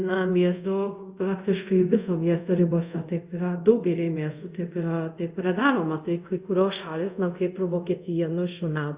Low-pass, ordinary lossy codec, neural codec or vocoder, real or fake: 3.6 kHz; Opus, 64 kbps; codec, 24 kHz, 0.5 kbps, DualCodec; fake